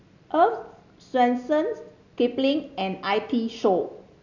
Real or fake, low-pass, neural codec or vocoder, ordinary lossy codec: real; 7.2 kHz; none; none